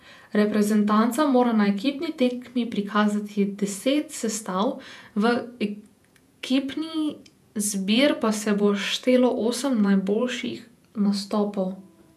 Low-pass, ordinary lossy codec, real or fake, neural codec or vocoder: 14.4 kHz; none; fake; vocoder, 48 kHz, 128 mel bands, Vocos